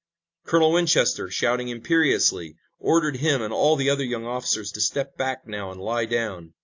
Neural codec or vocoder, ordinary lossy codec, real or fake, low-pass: none; AAC, 48 kbps; real; 7.2 kHz